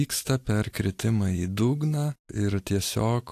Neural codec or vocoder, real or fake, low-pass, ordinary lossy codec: none; real; 14.4 kHz; AAC, 64 kbps